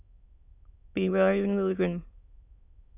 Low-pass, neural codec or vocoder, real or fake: 3.6 kHz; autoencoder, 22.05 kHz, a latent of 192 numbers a frame, VITS, trained on many speakers; fake